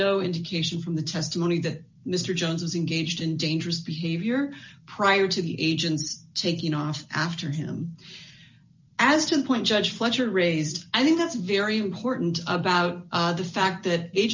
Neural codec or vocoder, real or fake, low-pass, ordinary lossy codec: none; real; 7.2 kHz; MP3, 64 kbps